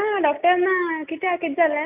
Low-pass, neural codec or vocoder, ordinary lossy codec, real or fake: 3.6 kHz; none; none; real